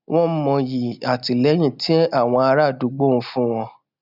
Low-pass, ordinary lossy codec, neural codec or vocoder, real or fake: 5.4 kHz; none; none; real